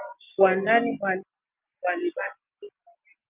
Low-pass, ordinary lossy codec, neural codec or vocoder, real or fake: 3.6 kHz; Opus, 24 kbps; none; real